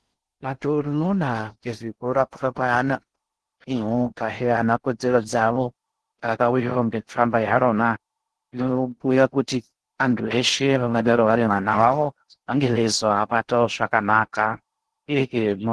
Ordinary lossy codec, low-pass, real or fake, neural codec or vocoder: Opus, 16 kbps; 10.8 kHz; fake; codec, 16 kHz in and 24 kHz out, 0.6 kbps, FocalCodec, streaming, 4096 codes